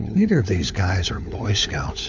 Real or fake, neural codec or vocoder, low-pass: fake; codec, 16 kHz, 4 kbps, FunCodec, trained on LibriTTS, 50 frames a second; 7.2 kHz